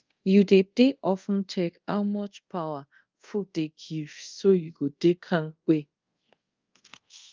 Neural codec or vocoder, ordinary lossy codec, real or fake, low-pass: codec, 24 kHz, 0.5 kbps, DualCodec; Opus, 24 kbps; fake; 7.2 kHz